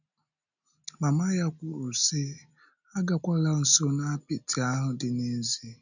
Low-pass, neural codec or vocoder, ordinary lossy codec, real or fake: 7.2 kHz; none; none; real